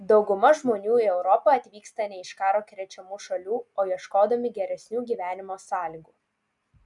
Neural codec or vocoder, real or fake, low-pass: none; real; 10.8 kHz